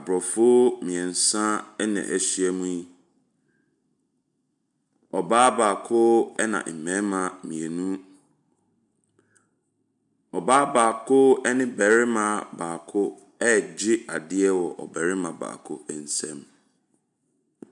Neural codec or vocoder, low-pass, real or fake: none; 10.8 kHz; real